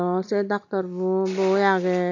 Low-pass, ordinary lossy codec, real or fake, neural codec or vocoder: 7.2 kHz; none; real; none